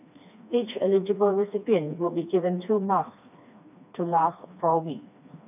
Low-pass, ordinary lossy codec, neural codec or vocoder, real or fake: 3.6 kHz; none; codec, 16 kHz, 2 kbps, FreqCodec, smaller model; fake